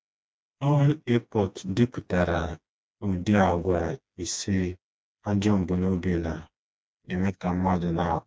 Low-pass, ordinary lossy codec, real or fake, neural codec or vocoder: none; none; fake; codec, 16 kHz, 2 kbps, FreqCodec, smaller model